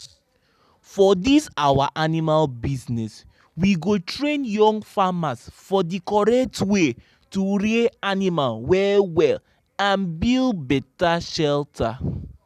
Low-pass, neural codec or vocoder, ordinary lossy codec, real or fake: 14.4 kHz; none; none; real